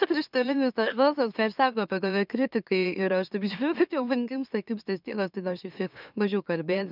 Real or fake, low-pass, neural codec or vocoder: fake; 5.4 kHz; autoencoder, 44.1 kHz, a latent of 192 numbers a frame, MeloTTS